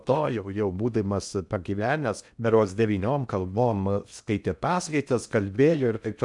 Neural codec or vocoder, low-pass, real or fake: codec, 16 kHz in and 24 kHz out, 0.6 kbps, FocalCodec, streaming, 2048 codes; 10.8 kHz; fake